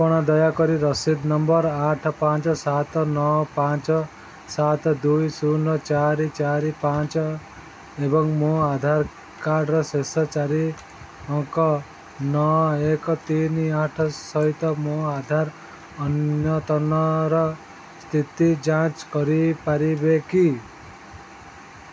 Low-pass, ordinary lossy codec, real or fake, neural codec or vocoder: none; none; real; none